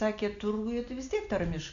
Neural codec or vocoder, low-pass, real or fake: none; 7.2 kHz; real